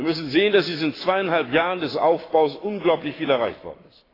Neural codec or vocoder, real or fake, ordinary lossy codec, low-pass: codec, 44.1 kHz, 7.8 kbps, Pupu-Codec; fake; AAC, 24 kbps; 5.4 kHz